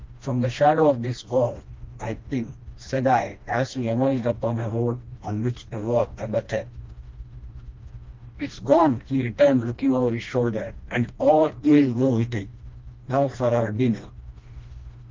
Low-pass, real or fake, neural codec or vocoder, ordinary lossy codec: 7.2 kHz; fake; codec, 16 kHz, 1 kbps, FreqCodec, smaller model; Opus, 24 kbps